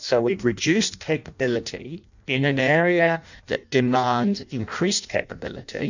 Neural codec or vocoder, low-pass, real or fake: codec, 16 kHz in and 24 kHz out, 0.6 kbps, FireRedTTS-2 codec; 7.2 kHz; fake